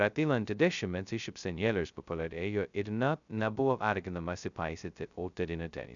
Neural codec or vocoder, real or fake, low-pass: codec, 16 kHz, 0.2 kbps, FocalCodec; fake; 7.2 kHz